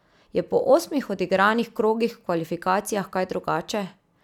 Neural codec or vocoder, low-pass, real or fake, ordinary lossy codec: none; 19.8 kHz; real; none